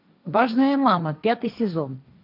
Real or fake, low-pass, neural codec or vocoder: fake; 5.4 kHz; codec, 16 kHz, 1.1 kbps, Voila-Tokenizer